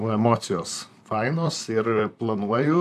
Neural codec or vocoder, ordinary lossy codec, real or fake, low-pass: vocoder, 44.1 kHz, 128 mel bands, Pupu-Vocoder; AAC, 96 kbps; fake; 14.4 kHz